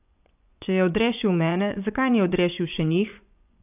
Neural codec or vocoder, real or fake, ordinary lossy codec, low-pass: vocoder, 24 kHz, 100 mel bands, Vocos; fake; none; 3.6 kHz